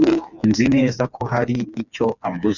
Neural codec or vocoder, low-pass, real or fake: codec, 16 kHz, 4 kbps, FreqCodec, smaller model; 7.2 kHz; fake